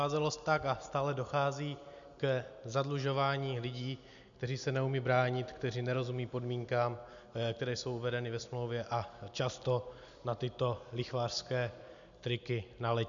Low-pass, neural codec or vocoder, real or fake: 7.2 kHz; none; real